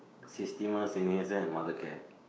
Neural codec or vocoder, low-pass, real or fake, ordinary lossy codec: codec, 16 kHz, 6 kbps, DAC; none; fake; none